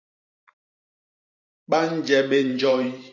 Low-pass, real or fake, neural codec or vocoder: 7.2 kHz; fake; vocoder, 44.1 kHz, 128 mel bands every 512 samples, BigVGAN v2